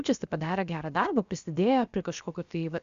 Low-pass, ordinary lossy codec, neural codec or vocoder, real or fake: 7.2 kHz; Opus, 64 kbps; codec, 16 kHz, about 1 kbps, DyCAST, with the encoder's durations; fake